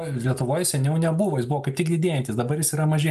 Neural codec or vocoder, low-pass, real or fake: none; 14.4 kHz; real